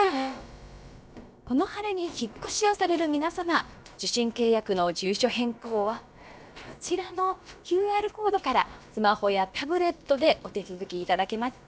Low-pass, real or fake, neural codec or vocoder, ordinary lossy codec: none; fake; codec, 16 kHz, about 1 kbps, DyCAST, with the encoder's durations; none